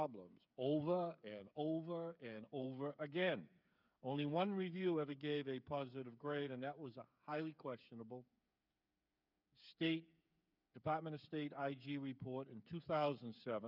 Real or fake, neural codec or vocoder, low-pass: fake; codec, 16 kHz, 8 kbps, FreqCodec, smaller model; 5.4 kHz